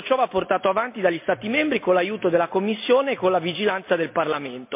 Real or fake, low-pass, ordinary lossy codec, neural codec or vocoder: real; 3.6 kHz; MP3, 32 kbps; none